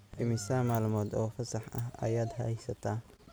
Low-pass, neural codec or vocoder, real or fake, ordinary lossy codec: none; none; real; none